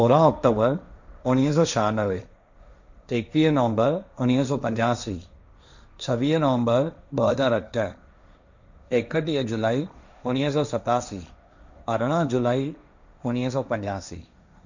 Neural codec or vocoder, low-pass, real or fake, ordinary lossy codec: codec, 16 kHz, 1.1 kbps, Voila-Tokenizer; 7.2 kHz; fake; none